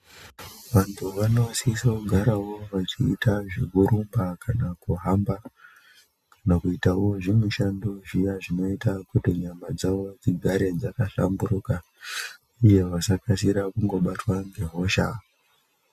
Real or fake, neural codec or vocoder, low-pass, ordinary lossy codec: real; none; 14.4 kHz; Opus, 64 kbps